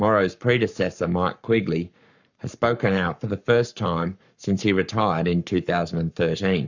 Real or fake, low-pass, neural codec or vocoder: fake; 7.2 kHz; codec, 44.1 kHz, 7.8 kbps, Pupu-Codec